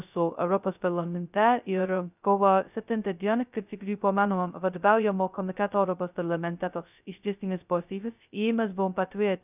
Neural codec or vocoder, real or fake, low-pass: codec, 16 kHz, 0.2 kbps, FocalCodec; fake; 3.6 kHz